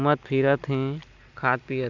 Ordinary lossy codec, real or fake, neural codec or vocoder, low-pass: none; real; none; 7.2 kHz